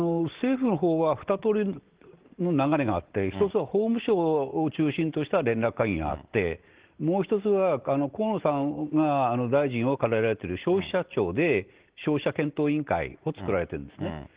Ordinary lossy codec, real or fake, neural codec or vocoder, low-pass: Opus, 16 kbps; real; none; 3.6 kHz